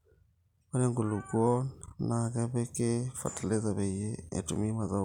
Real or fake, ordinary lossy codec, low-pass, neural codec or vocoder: real; none; 19.8 kHz; none